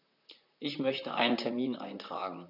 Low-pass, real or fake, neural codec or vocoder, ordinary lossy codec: 5.4 kHz; fake; vocoder, 44.1 kHz, 128 mel bands, Pupu-Vocoder; none